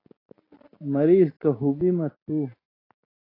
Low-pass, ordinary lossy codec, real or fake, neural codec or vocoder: 5.4 kHz; AAC, 24 kbps; real; none